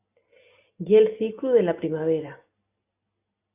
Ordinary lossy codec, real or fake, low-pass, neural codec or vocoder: AAC, 24 kbps; real; 3.6 kHz; none